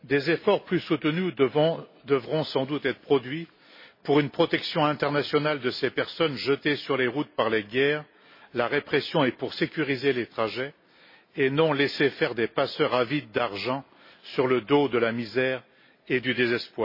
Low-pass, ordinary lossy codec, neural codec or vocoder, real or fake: 5.4 kHz; MP3, 24 kbps; none; real